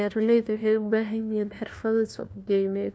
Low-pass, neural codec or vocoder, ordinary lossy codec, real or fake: none; codec, 16 kHz, 1 kbps, FunCodec, trained on LibriTTS, 50 frames a second; none; fake